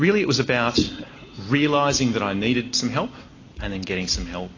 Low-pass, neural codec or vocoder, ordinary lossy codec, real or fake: 7.2 kHz; none; AAC, 32 kbps; real